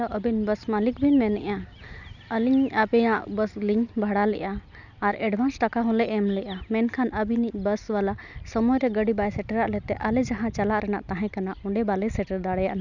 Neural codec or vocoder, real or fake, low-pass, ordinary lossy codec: none; real; 7.2 kHz; none